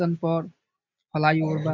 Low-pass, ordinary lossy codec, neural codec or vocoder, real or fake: 7.2 kHz; none; none; real